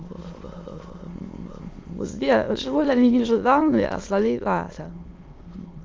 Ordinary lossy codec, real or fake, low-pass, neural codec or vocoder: Opus, 32 kbps; fake; 7.2 kHz; autoencoder, 22.05 kHz, a latent of 192 numbers a frame, VITS, trained on many speakers